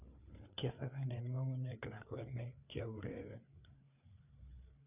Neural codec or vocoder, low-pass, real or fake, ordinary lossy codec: codec, 16 kHz, 4 kbps, FreqCodec, larger model; 3.6 kHz; fake; none